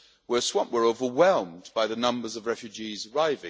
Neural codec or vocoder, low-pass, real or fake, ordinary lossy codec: none; none; real; none